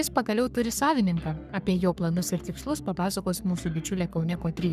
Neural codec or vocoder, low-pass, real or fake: codec, 44.1 kHz, 3.4 kbps, Pupu-Codec; 14.4 kHz; fake